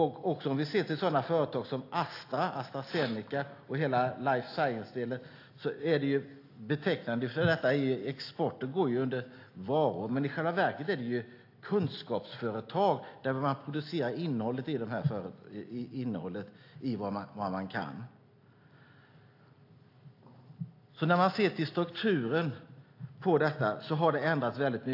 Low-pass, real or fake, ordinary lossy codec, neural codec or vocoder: 5.4 kHz; real; AAC, 32 kbps; none